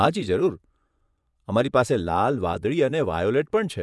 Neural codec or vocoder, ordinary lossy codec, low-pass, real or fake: vocoder, 24 kHz, 100 mel bands, Vocos; none; none; fake